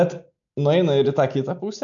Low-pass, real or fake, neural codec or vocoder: 7.2 kHz; real; none